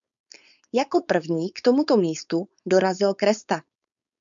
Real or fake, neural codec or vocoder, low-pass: fake; codec, 16 kHz, 4.8 kbps, FACodec; 7.2 kHz